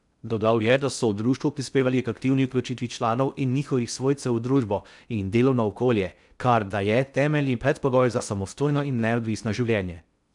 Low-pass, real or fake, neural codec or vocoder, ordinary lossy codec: 10.8 kHz; fake; codec, 16 kHz in and 24 kHz out, 0.6 kbps, FocalCodec, streaming, 2048 codes; none